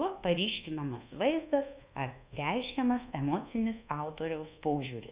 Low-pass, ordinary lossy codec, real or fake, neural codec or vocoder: 3.6 kHz; Opus, 64 kbps; fake; codec, 24 kHz, 1.2 kbps, DualCodec